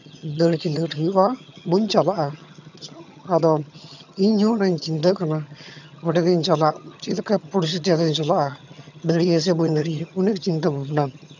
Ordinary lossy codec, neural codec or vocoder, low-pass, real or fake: none; vocoder, 22.05 kHz, 80 mel bands, HiFi-GAN; 7.2 kHz; fake